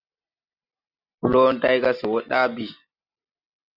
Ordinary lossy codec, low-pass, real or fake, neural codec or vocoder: AAC, 48 kbps; 5.4 kHz; real; none